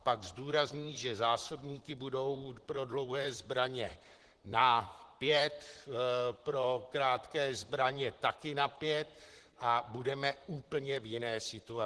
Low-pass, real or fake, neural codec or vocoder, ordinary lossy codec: 10.8 kHz; fake; vocoder, 44.1 kHz, 128 mel bands, Pupu-Vocoder; Opus, 16 kbps